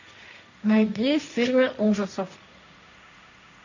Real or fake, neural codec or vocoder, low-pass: fake; codec, 16 kHz, 1.1 kbps, Voila-Tokenizer; 7.2 kHz